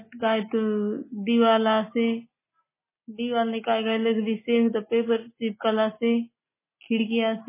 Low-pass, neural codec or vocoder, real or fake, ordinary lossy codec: 3.6 kHz; none; real; MP3, 16 kbps